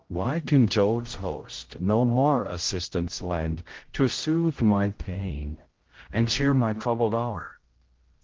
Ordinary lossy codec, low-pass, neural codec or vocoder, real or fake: Opus, 16 kbps; 7.2 kHz; codec, 16 kHz, 0.5 kbps, X-Codec, HuBERT features, trained on general audio; fake